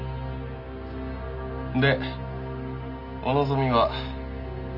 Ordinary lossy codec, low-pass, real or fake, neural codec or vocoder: none; 5.4 kHz; real; none